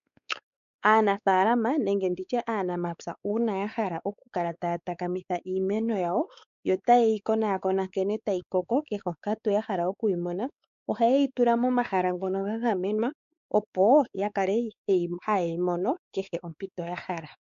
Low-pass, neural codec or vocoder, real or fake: 7.2 kHz; codec, 16 kHz, 4 kbps, X-Codec, WavLM features, trained on Multilingual LibriSpeech; fake